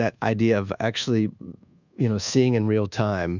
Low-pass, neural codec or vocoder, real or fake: 7.2 kHz; codec, 24 kHz, 1.2 kbps, DualCodec; fake